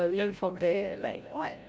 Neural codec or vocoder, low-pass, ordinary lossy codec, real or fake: codec, 16 kHz, 0.5 kbps, FreqCodec, larger model; none; none; fake